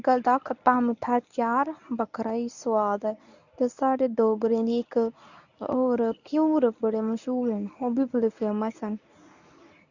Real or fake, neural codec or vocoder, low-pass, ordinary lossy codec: fake; codec, 24 kHz, 0.9 kbps, WavTokenizer, medium speech release version 1; 7.2 kHz; none